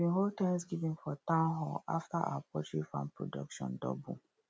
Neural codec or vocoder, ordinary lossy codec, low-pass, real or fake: none; none; none; real